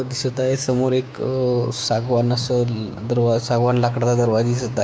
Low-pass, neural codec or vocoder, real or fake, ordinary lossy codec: none; codec, 16 kHz, 6 kbps, DAC; fake; none